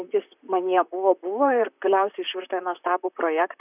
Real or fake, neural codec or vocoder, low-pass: fake; vocoder, 44.1 kHz, 128 mel bands, Pupu-Vocoder; 3.6 kHz